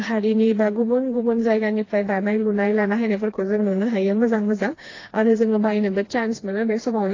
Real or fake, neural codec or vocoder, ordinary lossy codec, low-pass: fake; codec, 16 kHz, 2 kbps, FreqCodec, smaller model; AAC, 32 kbps; 7.2 kHz